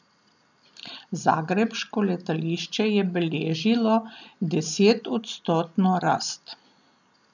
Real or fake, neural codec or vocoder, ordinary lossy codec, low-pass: real; none; none; 7.2 kHz